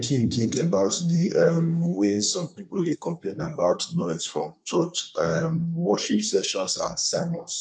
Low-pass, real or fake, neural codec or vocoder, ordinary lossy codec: 9.9 kHz; fake; codec, 24 kHz, 1 kbps, SNAC; none